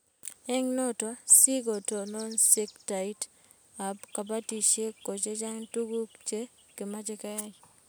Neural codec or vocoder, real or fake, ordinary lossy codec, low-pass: none; real; none; none